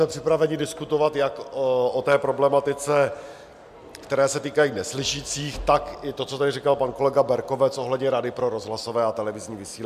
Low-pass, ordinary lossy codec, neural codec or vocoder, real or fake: 14.4 kHz; MP3, 96 kbps; none; real